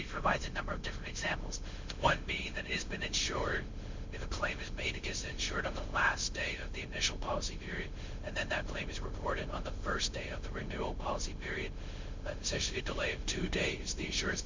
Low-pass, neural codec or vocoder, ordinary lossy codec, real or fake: 7.2 kHz; codec, 16 kHz, 0.9 kbps, LongCat-Audio-Codec; MP3, 64 kbps; fake